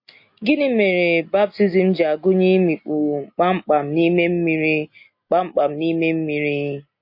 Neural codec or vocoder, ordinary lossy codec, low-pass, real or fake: none; MP3, 32 kbps; 5.4 kHz; real